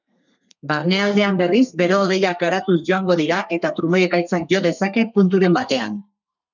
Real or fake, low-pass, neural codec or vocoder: fake; 7.2 kHz; codec, 32 kHz, 1.9 kbps, SNAC